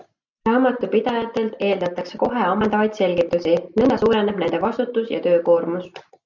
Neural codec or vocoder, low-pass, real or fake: none; 7.2 kHz; real